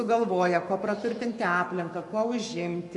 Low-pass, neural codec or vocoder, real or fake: 10.8 kHz; codec, 44.1 kHz, 7.8 kbps, Pupu-Codec; fake